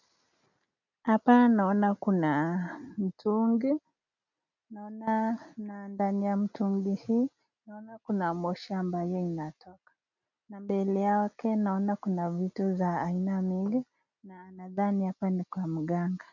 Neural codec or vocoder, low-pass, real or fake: none; 7.2 kHz; real